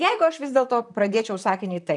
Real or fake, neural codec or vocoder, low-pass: fake; vocoder, 44.1 kHz, 128 mel bands, Pupu-Vocoder; 10.8 kHz